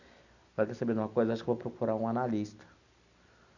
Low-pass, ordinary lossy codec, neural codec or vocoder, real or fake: 7.2 kHz; none; none; real